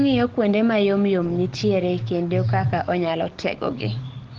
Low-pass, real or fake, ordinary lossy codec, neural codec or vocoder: 7.2 kHz; real; Opus, 16 kbps; none